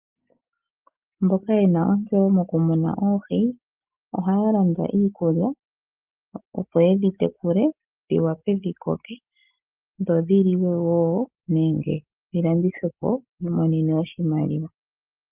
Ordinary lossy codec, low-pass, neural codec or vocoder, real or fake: Opus, 24 kbps; 3.6 kHz; none; real